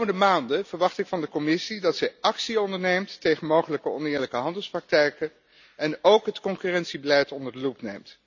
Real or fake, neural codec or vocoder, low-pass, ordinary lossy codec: real; none; 7.2 kHz; none